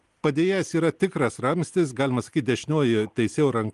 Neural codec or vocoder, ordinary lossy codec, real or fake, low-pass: none; Opus, 24 kbps; real; 10.8 kHz